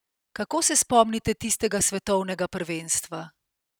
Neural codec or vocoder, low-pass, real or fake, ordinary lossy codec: none; none; real; none